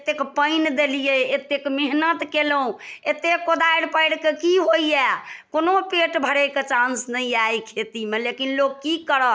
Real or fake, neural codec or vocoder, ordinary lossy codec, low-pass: real; none; none; none